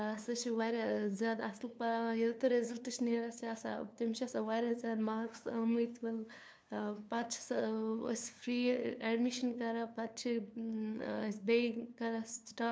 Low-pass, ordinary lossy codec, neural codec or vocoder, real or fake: none; none; codec, 16 kHz, 2 kbps, FunCodec, trained on LibriTTS, 25 frames a second; fake